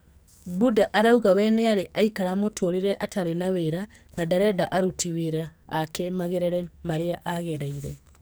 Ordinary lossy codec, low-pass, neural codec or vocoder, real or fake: none; none; codec, 44.1 kHz, 2.6 kbps, SNAC; fake